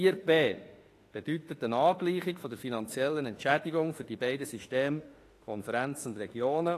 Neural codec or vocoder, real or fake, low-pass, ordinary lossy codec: autoencoder, 48 kHz, 32 numbers a frame, DAC-VAE, trained on Japanese speech; fake; 14.4 kHz; AAC, 48 kbps